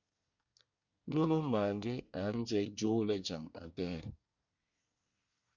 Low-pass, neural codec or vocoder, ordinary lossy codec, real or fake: 7.2 kHz; codec, 24 kHz, 1 kbps, SNAC; Opus, 64 kbps; fake